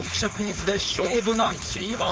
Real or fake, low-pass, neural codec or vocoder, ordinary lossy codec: fake; none; codec, 16 kHz, 4.8 kbps, FACodec; none